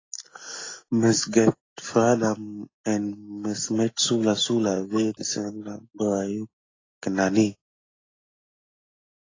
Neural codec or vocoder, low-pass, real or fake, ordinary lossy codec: none; 7.2 kHz; real; AAC, 32 kbps